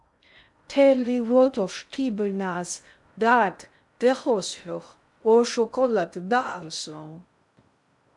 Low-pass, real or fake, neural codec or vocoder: 10.8 kHz; fake; codec, 16 kHz in and 24 kHz out, 0.6 kbps, FocalCodec, streaming, 2048 codes